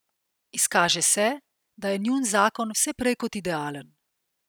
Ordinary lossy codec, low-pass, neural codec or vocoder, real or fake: none; none; none; real